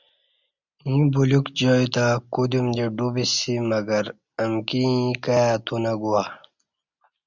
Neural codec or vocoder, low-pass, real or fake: none; 7.2 kHz; real